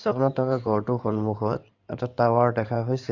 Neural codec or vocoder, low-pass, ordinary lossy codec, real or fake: codec, 16 kHz in and 24 kHz out, 2.2 kbps, FireRedTTS-2 codec; 7.2 kHz; none; fake